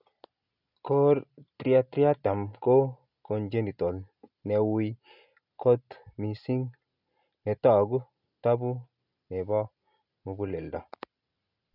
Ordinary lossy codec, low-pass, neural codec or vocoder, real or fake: none; 5.4 kHz; none; real